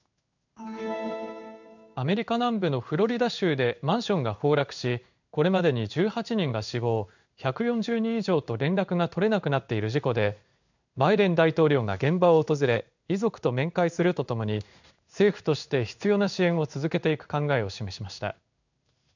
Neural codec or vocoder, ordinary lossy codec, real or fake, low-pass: codec, 16 kHz in and 24 kHz out, 1 kbps, XY-Tokenizer; none; fake; 7.2 kHz